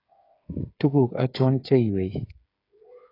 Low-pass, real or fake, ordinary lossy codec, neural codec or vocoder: 5.4 kHz; fake; AAC, 24 kbps; codec, 16 kHz, 8 kbps, FreqCodec, smaller model